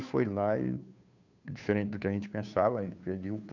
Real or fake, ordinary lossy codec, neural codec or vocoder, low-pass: fake; none; codec, 16 kHz, 2 kbps, FunCodec, trained on Chinese and English, 25 frames a second; 7.2 kHz